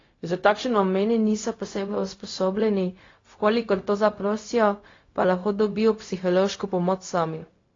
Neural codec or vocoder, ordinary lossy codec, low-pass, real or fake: codec, 16 kHz, 0.4 kbps, LongCat-Audio-Codec; AAC, 32 kbps; 7.2 kHz; fake